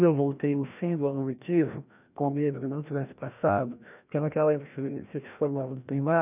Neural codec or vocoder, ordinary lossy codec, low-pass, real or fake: codec, 16 kHz, 1 kbps, FreqCodec, larger model; none; 3.6 kHz; fake